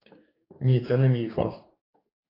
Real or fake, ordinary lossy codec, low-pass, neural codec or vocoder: fake; AAC, 24 kbps; 5.4 kHz; codec, 44.1 kHz, 2.6 kbps, DAC